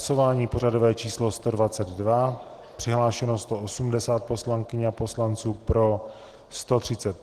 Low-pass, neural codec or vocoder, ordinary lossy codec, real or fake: 14.4 kHz; none; Opus, 16 kbps; real